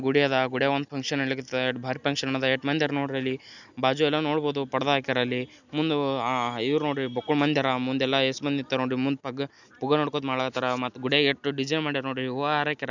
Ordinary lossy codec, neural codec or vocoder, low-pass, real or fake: none; none; 7.2 kHz; real